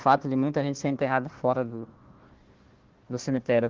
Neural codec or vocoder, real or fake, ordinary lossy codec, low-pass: codec, 16 kHz, 1 kbps, FunCodec, trained on Chinese and English, 50 frames a second; fake; Opus, 16 kbps; 7.2 kHz